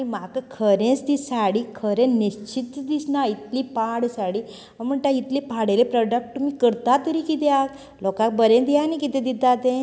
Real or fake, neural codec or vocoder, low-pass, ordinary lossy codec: real; none; none; none